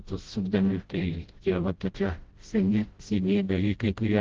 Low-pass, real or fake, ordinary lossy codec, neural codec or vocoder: 7.2 kHz; fake; Opus, 16 kbps; codec, 16 kHz, 0.5 kbps, FreqCodec, smaller model